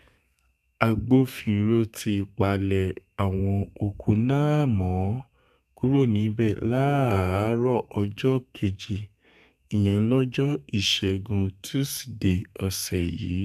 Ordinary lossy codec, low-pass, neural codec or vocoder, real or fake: none; 14.4 kHz; codec, 32 kHz, 1.9 kbps, SNAC; fake